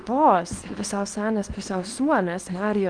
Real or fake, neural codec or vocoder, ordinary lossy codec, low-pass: fake; codec, 24 kHz, 0.9 kbps, WavTokenizer, small release; Opus, 24 kbps; 9.9 kHz